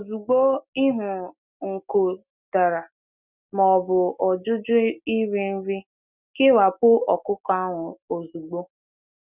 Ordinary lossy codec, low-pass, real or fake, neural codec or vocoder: none; 3.6 kHz; real; none